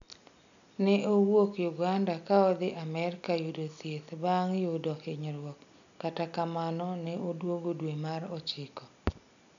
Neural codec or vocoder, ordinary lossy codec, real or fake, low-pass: none; none; real; 7.2 kHz